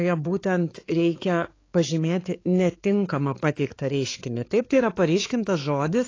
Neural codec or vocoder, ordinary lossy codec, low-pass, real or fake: codec, 16 kHz, 4 kbps, X-Codec, HuBERT features, trained on balanced general audio; AAC, 32 kbps; 7.2 kHz; fake